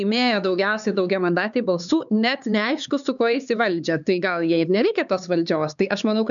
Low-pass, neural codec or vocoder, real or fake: 7.2 kHz; codec, 16 kHz, 4 kbps, X-Codec, HuBERT features, trained on LibriSpeech; fake